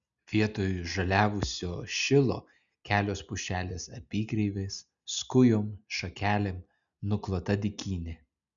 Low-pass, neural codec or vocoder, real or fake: 7.2 kHz; none; real